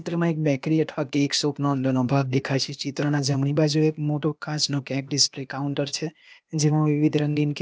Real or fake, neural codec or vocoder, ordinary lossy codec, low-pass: fake; codec, 16 kHz, 0.8 kbps, ZipCodec; none; none